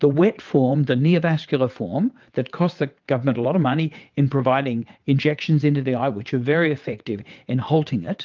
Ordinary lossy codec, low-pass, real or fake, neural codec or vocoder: Opus, 24 kbps; 7.2 kHz; fake; vocoder, 22.05 kHz, 80 mel bands, WaveNeXt